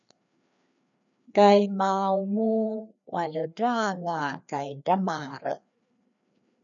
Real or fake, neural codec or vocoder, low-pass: fake; codec, 16 kHz, 2 kbps, FreqCodec, larger model; 7.2 kHz